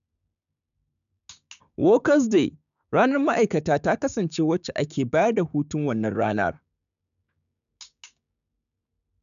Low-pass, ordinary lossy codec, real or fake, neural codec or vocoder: 7.2 kHz; none; fake; codec, 16 kHz, 6 kbps, DAC